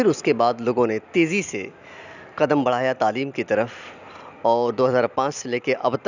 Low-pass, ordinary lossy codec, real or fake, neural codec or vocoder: 7.2 kHz; none; real; none